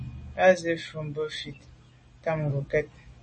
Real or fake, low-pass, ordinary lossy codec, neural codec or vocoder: real; 10.8 kHz; MP3, 32 kbps; none